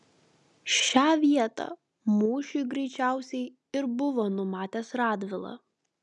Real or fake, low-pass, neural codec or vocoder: real; 10.8 kHz; none